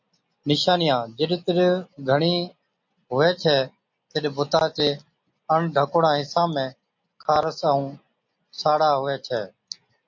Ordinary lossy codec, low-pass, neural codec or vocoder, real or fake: MP3, 48 kbps; 7.2 kHz; none; real